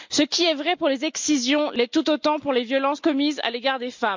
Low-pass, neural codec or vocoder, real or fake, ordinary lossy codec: 7.2 kHz; none; real; MP3, 64 kbps